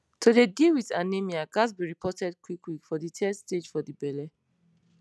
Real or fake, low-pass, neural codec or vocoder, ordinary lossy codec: real; none; none; none